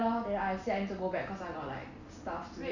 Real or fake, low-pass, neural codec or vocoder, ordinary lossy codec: real; 7.2 kHz; none; none